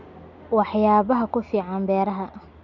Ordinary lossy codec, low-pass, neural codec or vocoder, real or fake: none; 7.2 kHz; none; real